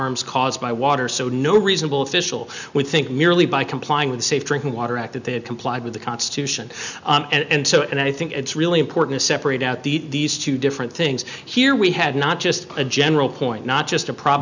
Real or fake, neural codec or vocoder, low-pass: real; none; 7.2 kHz